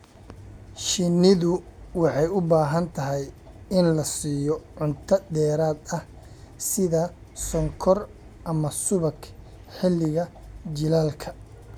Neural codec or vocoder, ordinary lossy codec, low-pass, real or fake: none; none; 19.8 kHz; real